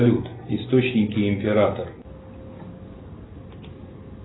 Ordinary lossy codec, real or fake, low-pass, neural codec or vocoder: AAC, 16 kbps; real; 7.2 kHz; none